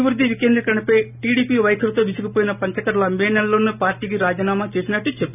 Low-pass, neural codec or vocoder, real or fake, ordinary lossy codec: 3.6 kHz; none; real; none